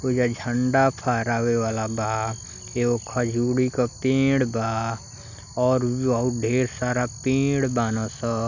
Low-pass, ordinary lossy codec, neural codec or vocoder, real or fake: 7.2 kHz; none; none; real